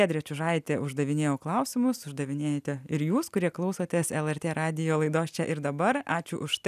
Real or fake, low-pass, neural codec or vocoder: real; 14.4 kHz; none